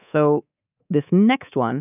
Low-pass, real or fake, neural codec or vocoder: 3.6 kHz; fake; codec, 24 kHz, 3.1 kbps, DualCodec